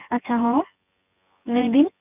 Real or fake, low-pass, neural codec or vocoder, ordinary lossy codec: fake; 3.6 kHz; vocoder, 24 kHz, 100 mel bands, Vocos; none